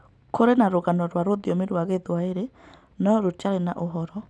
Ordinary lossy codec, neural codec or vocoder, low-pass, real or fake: none; none; none; real